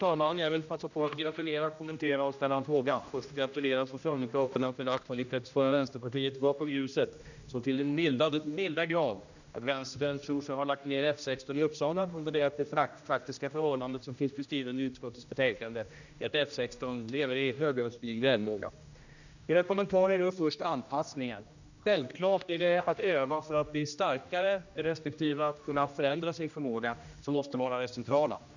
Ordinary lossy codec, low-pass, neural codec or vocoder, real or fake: none; 7.2 kHz; codec, 16 kHz, 1 kbps, X-Codec, HuBERT features, trained on general audio; fake